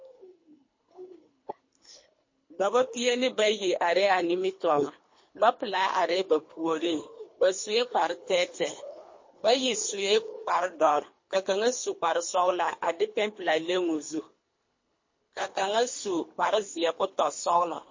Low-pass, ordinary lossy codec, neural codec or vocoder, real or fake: 7.2 kHz; MP3, 32 kbps; codec, 24 kHz, 3 kbps, HILCodec; fake